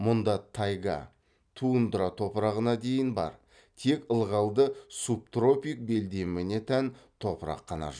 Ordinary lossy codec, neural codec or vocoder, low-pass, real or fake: none; none; 9.9 kHz; real